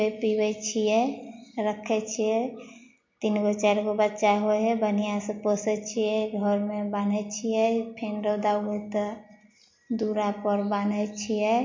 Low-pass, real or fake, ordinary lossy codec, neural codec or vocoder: 7.2 kHz; real; MP3, 48 kbps; none